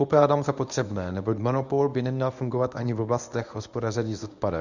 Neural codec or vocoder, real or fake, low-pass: codec, 24 kHz, 0.9 kbps, WavTokenizer, medium speech release version 1; fake; 7.2 kHz